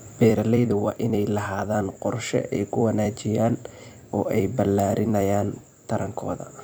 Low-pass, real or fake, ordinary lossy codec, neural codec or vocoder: none; fake; none; vocoder, 44.1 kHz, 128 mel bands every 256 samples, BigVGAN v2